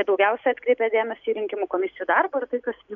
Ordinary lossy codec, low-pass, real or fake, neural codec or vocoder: MP3, 96 kbps; 7.2 kHz; real; none